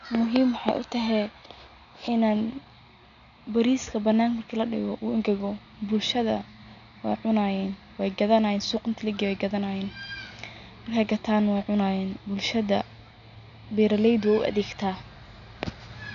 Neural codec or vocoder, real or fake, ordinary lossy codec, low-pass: none; real; none; 7.2 kHz